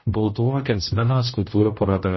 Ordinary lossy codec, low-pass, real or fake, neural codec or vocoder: MP3, 24 kbps; 7.2 kHz; fake; codec, 16 kHz, 0.5 kbps, X-Codec, HuBERT features, trained on general audio